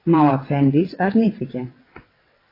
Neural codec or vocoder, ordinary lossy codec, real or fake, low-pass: vocoder, 22.05 kHz, 80 mel bands, WaveNeXt; AAC, 24 kbps; fake; 5.4 kHz